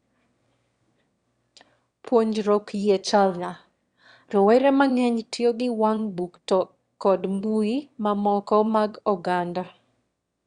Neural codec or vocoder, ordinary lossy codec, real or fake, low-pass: autoencoder, 22.05 kHz, a latent of 192 numbers a frame, VITS, trained on one speaker; Opus, 64 kbps; fake; 9.9 kHz